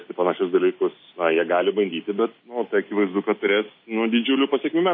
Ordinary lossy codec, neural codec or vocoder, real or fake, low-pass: MP3, 24 kbps; none; real; 7.2 kHz